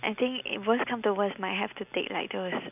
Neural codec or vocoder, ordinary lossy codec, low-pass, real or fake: none; none; 3.6 kHz; real